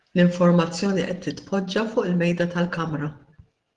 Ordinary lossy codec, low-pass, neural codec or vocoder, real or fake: Opus, 16 kbps; 10.8 kHz; none; real